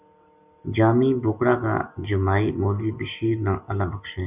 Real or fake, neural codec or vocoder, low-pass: real; none; 3.6 kHz